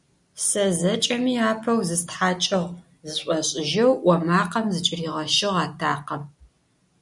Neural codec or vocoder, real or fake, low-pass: none; real; 10.8 kHz